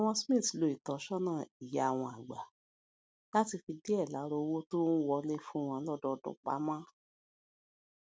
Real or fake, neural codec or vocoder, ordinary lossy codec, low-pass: real; none; none; none